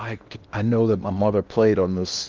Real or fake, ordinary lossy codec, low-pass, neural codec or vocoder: fake; Opus, 24 kbps; 7.2 kHz; codec, 16 kHz in and 24 kHz out, 0.8 kbps, FocalCodec, streaming, 65536 codes